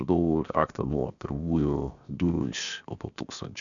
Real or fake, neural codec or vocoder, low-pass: fake; codec, 16 kHz, 0.7 kbps, FocalCodec; 7.2 kHz